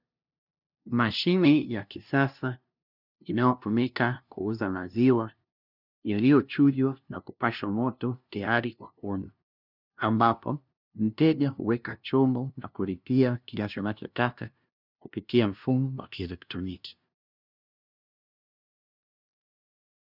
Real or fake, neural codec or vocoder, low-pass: fake; codec, 16 kHz, 0.5 kbps, FunCodec, trained on LibriTTS, 25 frames a second; 5.4 kHz